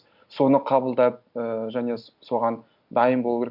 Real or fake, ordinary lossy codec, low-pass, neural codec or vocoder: real; none; 5.4 kHz; none